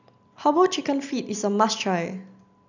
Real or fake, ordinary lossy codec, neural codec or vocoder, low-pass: real; none; none; 7.2 kHz